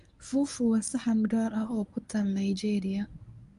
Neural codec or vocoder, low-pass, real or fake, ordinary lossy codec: codec, 24 kHz, 0.9 kbps, WavTokenizer, medium speech release version 1; 10.8 kHz; fake; none